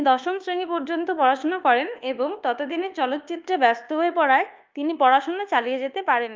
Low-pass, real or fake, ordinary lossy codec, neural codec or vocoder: 7.2 kHz; fake; Opus, 24 kbps; codec, 24 kHz, 1.2 kbps, DualCodec